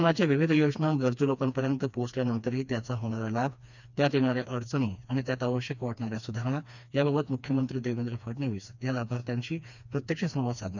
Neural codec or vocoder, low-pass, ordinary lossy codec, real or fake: codec, 16 kHz, 2 kbps, FreqCodec, smaller model; 7.2 kHz; none; fake